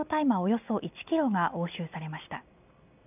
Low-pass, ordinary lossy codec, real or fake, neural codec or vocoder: 3.6 kHz; none; real; none